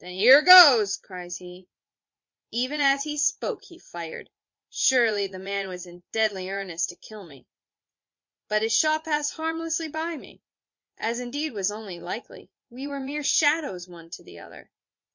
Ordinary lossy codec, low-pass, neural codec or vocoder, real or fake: MP3, 48 kbps; 7.2 kHz; vocoder, 44.1 kHz, 80 mel bands, Vocos; fake